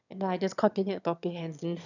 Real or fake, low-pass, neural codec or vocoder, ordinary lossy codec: fake; 7.2 kHz; autoencoder, 22.05 kHz, a latent of 192 numbers a frame, VITS, trained on one speaker; none